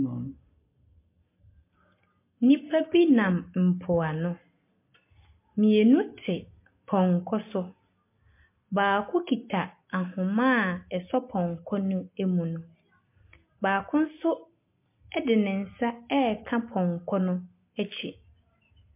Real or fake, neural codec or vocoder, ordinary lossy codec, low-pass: real; none; MP3, 24 kbps; 3.6 kHz